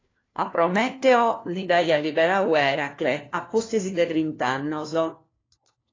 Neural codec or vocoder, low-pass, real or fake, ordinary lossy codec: codec, 16 kHz, 1 kbps, FunCodec, trained on LibriTTS, 50 frames a second; 7.2 kHz; fake; AAC, 32 kbps